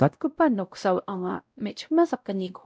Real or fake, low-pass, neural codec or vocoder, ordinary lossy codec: fake; none; codec, 16 kHz, 0.5 kbps, X-Codec, WavLM features, trained on Multilingual LibriSpeech; none